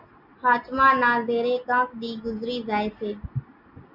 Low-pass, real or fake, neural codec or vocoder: 5.4 kHz; real; none